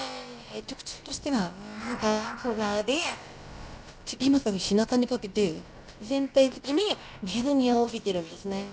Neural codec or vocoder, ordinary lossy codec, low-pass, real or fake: codec, 16 kHz, about 1 kbps, DyCAST, with the encoder's durations; none; none; fake